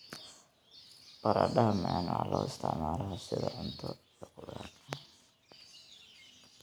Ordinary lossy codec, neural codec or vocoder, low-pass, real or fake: none; none; none; real